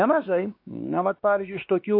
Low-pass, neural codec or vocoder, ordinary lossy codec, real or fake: 5.4 kHz; codec, 16 kHz, 2 kbps, X-Codec, WavLM features, trained on Multilingual LibriSpeech; AAC, 32 kbps; fake